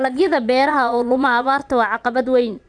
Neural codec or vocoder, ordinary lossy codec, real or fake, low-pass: vocoder, 22.05 kHz, 80 mel bands, Vocos; none; fake; 9.9 kHz